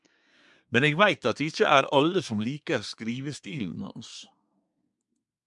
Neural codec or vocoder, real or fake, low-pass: codec, 24 kHz, 1 kbps, SNAC; fake; 10.8 kHz